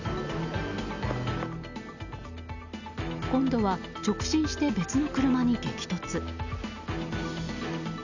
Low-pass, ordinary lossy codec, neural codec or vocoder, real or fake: 7.2 kHz; none; none; real